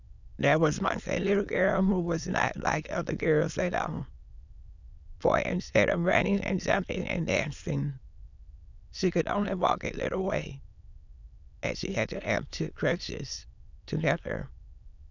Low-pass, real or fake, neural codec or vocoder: 7.2 kHz; fake; autoencoder, 22.05 kHz, a latent of 192 numbers a frame, VITS, trained on many speakers